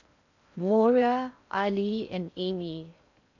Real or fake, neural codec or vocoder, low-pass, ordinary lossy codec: fake; codec, 16 kHz in and 24 kHz out, 0.6 kbps, FocalCodec, streaming, 4096 codes; 7.2 kHz; none